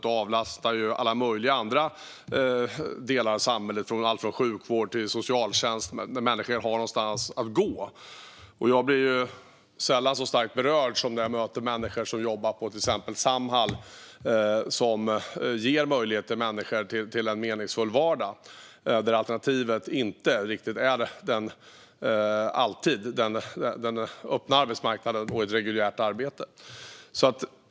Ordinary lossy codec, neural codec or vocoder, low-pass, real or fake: none; none; none; real